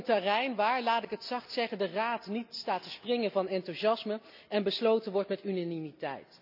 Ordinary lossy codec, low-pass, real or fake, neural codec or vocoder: none; 5.4 kHz; real; none